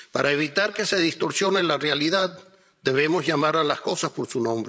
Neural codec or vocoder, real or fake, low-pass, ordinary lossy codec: codec, 16 kHz, 16 kbps, FreqCodec, larger model; fake; none; none